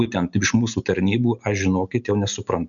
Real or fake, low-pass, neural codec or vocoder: real; 7.2 kHz; none